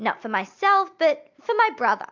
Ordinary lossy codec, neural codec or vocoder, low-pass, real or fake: MP3, 64 kbps; none; 7.2 kHz; real